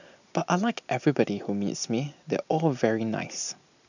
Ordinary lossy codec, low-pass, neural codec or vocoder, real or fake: none; 7.2 kHz; none; real